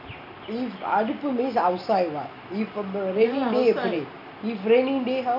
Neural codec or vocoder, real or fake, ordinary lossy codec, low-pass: none; real; none; 5.4 kHz